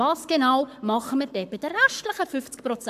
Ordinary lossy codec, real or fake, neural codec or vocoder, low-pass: AAC, 96 kbps; fake; codec, 44.1 kHz, 7.8 kbps, Pupu-Codec; 14.4 kHz